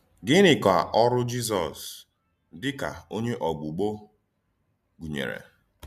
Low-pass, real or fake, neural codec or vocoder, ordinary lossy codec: 14.4 kHz; real; none; none